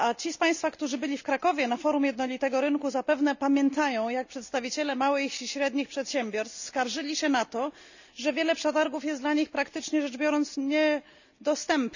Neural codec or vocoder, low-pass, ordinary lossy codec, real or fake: none; 7.2 kHz; none; real